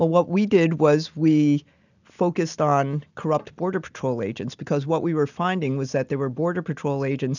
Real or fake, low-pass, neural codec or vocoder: real; 7.2 kHz; none